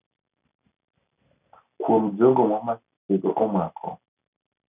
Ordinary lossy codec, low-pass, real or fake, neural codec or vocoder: none; 3.6 kHz; real; none